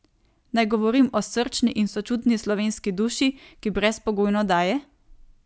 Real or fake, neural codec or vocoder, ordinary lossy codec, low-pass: real; none; none; none